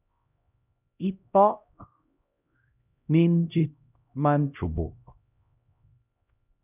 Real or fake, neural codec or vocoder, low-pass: fake; codec, 16 kHz, 0.5 kbps, X-Codec, HuBERT features, trained on LibriSpeech; 3.6 kHz